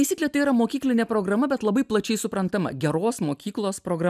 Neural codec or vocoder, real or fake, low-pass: none; real; 14.4 kHz